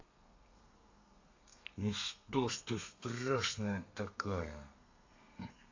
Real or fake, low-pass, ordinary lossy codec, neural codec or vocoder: fake; 7.2 kHz; AAC, 48 kbps; codec, 32 kHz, 1.9 kbps, SNAC